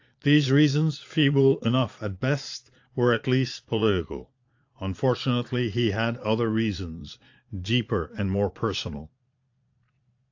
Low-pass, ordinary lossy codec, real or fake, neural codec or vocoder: 7.2 kHz; AAC, 48 kbps; fake; vocoder, 22.05 kHz, 80 mel bands, Vocos